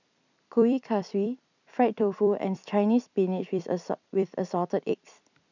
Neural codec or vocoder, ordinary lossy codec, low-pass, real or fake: vocoder, 44.1 kHz, 128 mel bands every 256 samples, BigVGAN v2; none; 7.2 kHz; fake